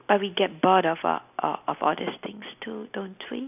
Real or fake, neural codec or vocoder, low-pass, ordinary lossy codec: real; none; 3.6 kHz; none